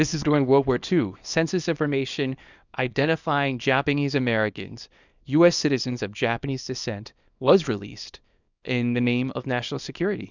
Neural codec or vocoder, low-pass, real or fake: codec, 24 kHz, 0.9 kbps, WavTokenizer, small release; 7.2 kHz; fake